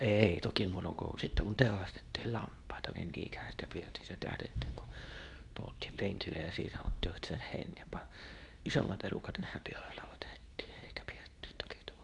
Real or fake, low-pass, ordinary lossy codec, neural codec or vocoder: fake; 10.8 kHz; none; codec, 24 kHz, 0.9 kbps, WavTokenizer, medium speech release version 2